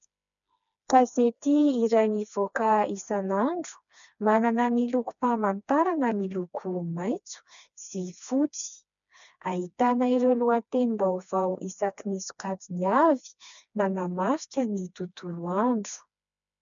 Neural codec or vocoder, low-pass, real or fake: codec, 16 kHz, 2 kbps, FreqCodec, smaller model; 7.2 kHz; fake